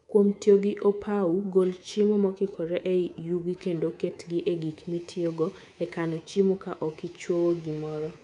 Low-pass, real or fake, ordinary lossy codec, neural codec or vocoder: 10.8 kHz; fake; none; codec, 24 kHz, 3.1 kbps, DualCodec